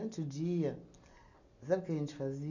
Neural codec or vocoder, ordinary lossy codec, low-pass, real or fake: none; none; 7.2 kHz; real